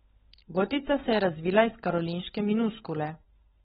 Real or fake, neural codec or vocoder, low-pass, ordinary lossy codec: fake; codec, 16 kHz, 16 kbps, FunCodec, trained on LibriTTS, 50 frames a second; 7.2 kHz; AAC, 16 kbps